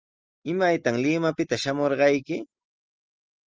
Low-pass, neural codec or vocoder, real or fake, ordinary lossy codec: 7.2 kHz; none; real; Opus, 24 kbps